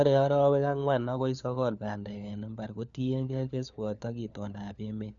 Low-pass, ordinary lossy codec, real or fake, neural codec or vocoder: 7.2 kHz; none; fake; codec, 16 kHz, 4 kbps, FunCodec, trained on LibriTTS, 50 frames a second